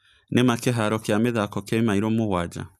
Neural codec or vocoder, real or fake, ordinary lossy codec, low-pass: none; real; none; 14.4 kHz